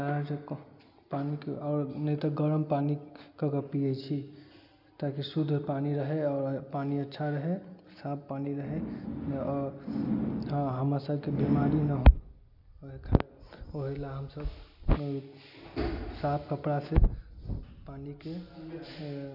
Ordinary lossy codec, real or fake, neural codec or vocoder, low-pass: none; real; none; 5.4 kHz